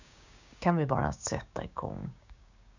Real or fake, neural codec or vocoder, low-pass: fake; codec, 16 kHz, 6 kbps, DAC; 7.2 kHz